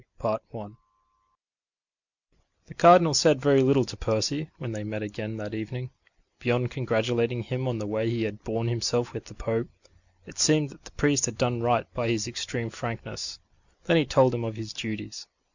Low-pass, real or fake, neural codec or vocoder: 7.2 kHz; real; none